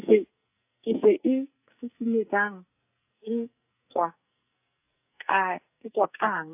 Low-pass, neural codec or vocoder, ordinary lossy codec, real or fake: 3.6 kHz; codec, 44.1 kHz, 2.6 kbps, SNAC; AAC, 32 kbps; fake